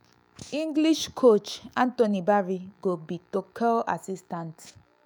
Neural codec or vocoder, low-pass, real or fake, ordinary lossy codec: autoencoder, 48 kHz, 128 numbers a frame, DAC-VAE, trained on Japanese speech; none; fake; none